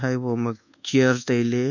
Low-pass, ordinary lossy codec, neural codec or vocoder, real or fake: 7.2 kHz; none; codec, 24 kHz, 1.2 kbps, DualCodec; fake